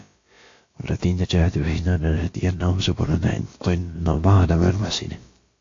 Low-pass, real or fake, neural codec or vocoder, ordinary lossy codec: 7.2 kHz; fake; codec, 16 kHz, about 1 kbps, DyCAST, with the encoder's durations; AAC, 48 kbps